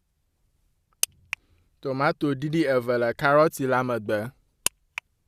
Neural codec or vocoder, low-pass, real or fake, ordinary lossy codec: none; 14.4 kHz; real; Opus, 64 kbps